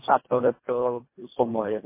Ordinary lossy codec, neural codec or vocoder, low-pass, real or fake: MP3, 24 kbps; codec, 24 kHz, 1.5 kbps, HILCodec; 3.6 kHz; fake